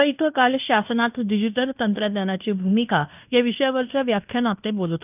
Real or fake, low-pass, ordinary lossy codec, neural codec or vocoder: fake; 3.6 kHz; none; codec, 16 kHz in and 24 kHz out, 0.9 kbps, LongCat-Audio-Codec, fine tuned four codebook decoder